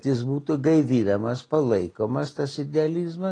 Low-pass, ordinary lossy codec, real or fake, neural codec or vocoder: 9.9 kHz; AAC, 32 kbps; real; none